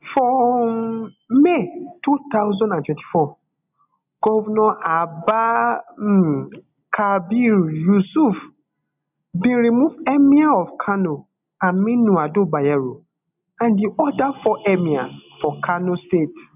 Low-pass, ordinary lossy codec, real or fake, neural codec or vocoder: 3.6 kHz; none; real; none